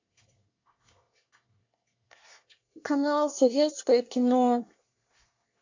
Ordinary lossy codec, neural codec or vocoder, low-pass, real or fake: AAC, 48 kbps; codec, 24 kHz, 1 kbps, SNAC; 7.2 kHz; fake